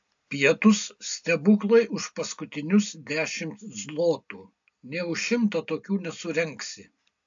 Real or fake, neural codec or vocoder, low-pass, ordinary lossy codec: real; none; 7.2 kHz; AAC, 64 kbps